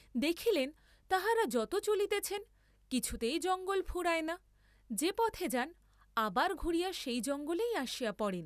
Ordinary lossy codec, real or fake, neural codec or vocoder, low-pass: none; real; none; 14.4 kHz